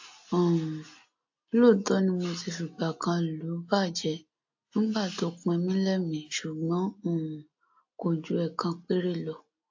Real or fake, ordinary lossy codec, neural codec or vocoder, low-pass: real; AAC, 48 kbps; none; 7.2 kHz